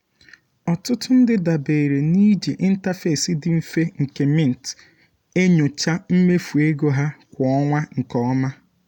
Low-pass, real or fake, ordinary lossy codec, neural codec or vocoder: 19.8 kHz; real; none; none